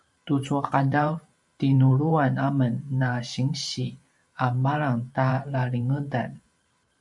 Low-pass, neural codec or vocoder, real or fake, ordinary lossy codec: 10.8 kHz; vocoder, 44.1 kHz, 128 mel bands every 512 samples, BigVGAN v2; fake; MP3, 64 kbps